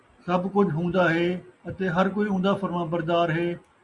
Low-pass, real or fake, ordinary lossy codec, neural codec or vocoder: 10.8 kHz; real; Opus, 64 kbps; none